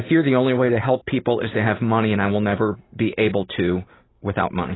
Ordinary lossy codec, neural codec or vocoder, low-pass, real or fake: AAC, 16 kbps; none; 7.2 kHz; real